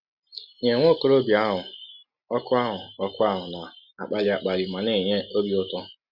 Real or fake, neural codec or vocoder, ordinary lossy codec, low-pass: real; none; Opus, 64 kbps; 5.4 kHz